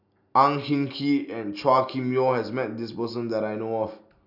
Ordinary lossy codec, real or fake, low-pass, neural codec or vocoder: none; real; 5.4 kHz; none